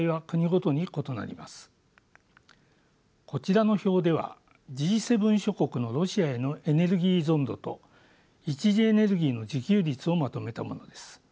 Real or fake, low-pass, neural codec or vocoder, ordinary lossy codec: real; none; none; none